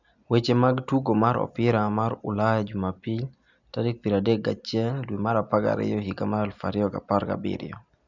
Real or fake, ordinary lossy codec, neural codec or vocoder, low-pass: real; none; none; 7.2 kHz